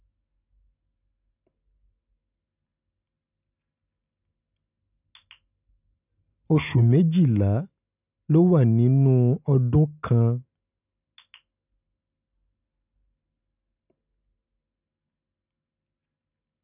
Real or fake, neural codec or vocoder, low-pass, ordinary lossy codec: real; none; 3.6 kHz; none